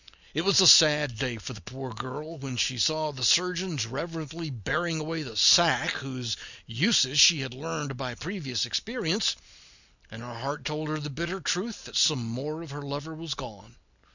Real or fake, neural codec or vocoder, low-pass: real; none; 7.2 kHz